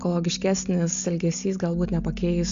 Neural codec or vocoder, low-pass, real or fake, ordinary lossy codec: none; 7.2 kHz; real; Opus, 64 kbps